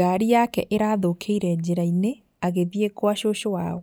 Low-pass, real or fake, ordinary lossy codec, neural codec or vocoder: none; real; none; none